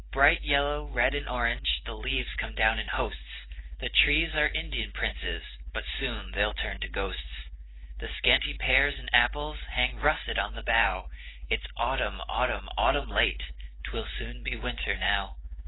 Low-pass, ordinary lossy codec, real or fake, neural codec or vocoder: 7.2 kHz; AAC, 16 kbps; real; none